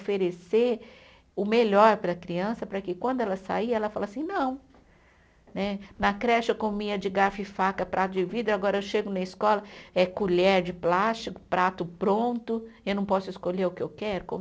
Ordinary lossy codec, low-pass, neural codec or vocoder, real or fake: none; none; none; real